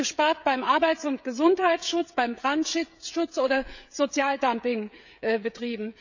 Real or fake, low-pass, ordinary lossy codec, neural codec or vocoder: fake; 7.2 kHz; none; codec, 16 kHz, 16 kbps, FreqCodec, smaller model